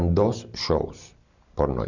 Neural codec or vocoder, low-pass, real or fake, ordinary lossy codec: none; 7.2 kHz; real; none